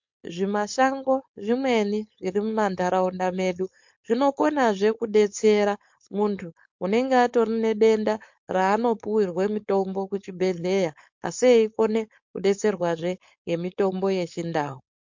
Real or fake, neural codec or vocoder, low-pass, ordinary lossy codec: fake; codec, 16 kHz, 4.8 kbps, FACodec; 7.2 kHz; MP3, 48 kbps